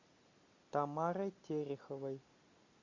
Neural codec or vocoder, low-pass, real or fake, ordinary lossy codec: none; 7.2 kHz; real; MP3, 48 kbps